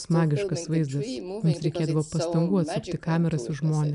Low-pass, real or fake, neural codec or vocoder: 10.8 kHz; real; none